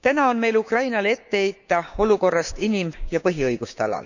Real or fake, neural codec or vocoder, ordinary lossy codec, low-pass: fake; codec, 16 kHz, 6 kbps, DAC; none; 7.2 kHz